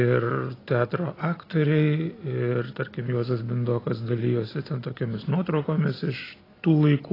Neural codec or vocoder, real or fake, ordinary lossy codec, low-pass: none; real; AAC, 24 kbps; 5.4 kHz